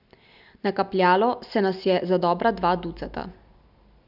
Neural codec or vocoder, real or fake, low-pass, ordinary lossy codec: none; real; 5.4 kHz; none